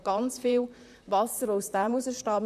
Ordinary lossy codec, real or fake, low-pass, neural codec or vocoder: none; fake; 14.4 kHz; vocoder, 44.1 kHz, 128 mel bands, Pupu-Vocoder